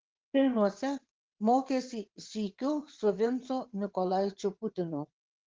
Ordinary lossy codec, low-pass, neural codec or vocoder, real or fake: Opus, 16 kbps; 7.2 kHz; vocoder, 22.05 kHz, 80 mel bands, WaveNeXt; fake